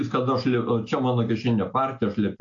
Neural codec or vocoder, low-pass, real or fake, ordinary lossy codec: none; 7.2 kHz; real; AAC, 48 kbps